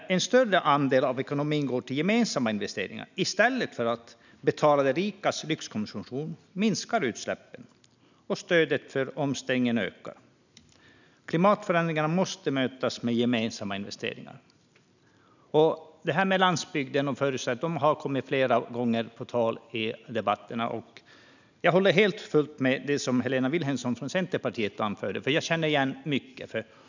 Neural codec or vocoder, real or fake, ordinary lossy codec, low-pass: none; real; none; 7.2 kHz